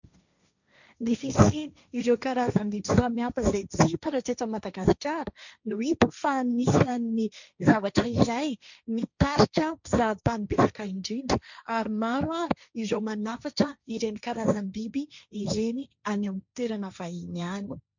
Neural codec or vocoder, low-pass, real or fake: codec, 16 kHz, 1.1 kbps, Voila-Tokenizer; 7.2 kHz; fake